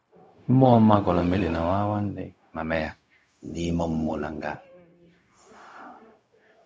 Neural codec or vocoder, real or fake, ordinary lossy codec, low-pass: codec, 16 kHz, 0.4 kbps, LongCat-Audio-Codec; fake; none; none